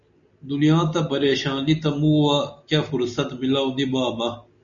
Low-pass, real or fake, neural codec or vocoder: 7.2 kHz; real; none